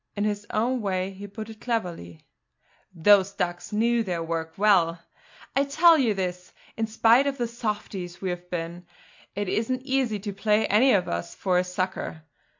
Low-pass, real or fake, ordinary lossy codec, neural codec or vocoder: 7.2 kHz; real; MP3, 48 kbps; none